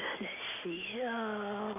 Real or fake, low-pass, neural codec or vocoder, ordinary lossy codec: real; 3.6 kHz; none; none